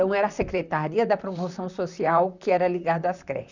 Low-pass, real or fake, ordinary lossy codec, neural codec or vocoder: 7.2 kHz; fake; none; vocoder, 44.1 kHz, 128 mel bands, Pupu-Vocoder